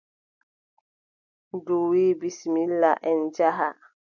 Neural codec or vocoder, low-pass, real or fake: none; 7.2 kHz; real